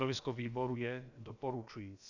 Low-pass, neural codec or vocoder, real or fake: 7.2 kHz; codec, 16 kHz, about 1 kbps, DyCAST, with the encoder's durations; fake